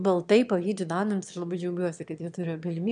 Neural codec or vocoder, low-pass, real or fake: autoencoder, 22.05 kHz, a latent of 192 numbers a frame, VITS, trained on one speaker; 9.9 kHz; fake